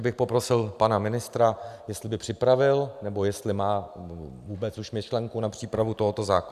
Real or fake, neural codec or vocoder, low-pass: real; none; 14.4 kHz